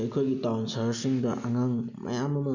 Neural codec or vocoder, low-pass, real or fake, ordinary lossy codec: none; 7.2 kHz; real; none